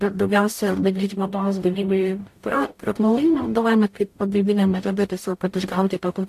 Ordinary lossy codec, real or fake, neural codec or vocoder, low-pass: AAC, 64 kbps; fake; codec, 44.1 kHz, 0.9 kbps, DAC; 14.4 kHz